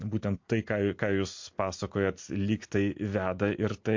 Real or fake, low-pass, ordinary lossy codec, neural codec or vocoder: real; 7.2 kHz; MP3, 48 kbps; none